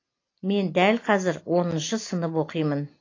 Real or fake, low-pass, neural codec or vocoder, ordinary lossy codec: real; 7.2 kHz; none; AAC, 32 kbps